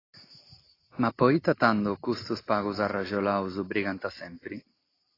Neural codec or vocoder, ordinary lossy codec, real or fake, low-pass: none; AAC, 24 kbps; real; 5.4 kHz